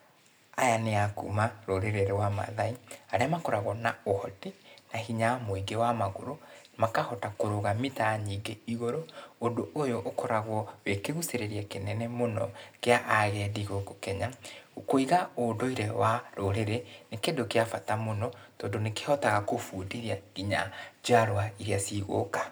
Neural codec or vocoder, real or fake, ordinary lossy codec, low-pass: none; real; none; none